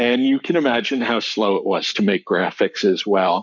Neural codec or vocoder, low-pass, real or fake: codec, 44.1 kHz, 7.8 kbps, Pupu-Codec; 7.2 kHz; fake